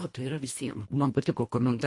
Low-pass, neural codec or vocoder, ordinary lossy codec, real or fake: 10.8 kHz; codec, 24 kHz, 1.5 kbps, HILCodec; MP3, 48 kbps; fake